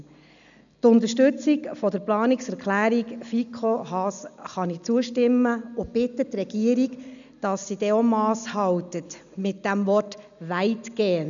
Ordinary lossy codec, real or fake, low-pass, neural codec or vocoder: none; real; 7.2 kHz; none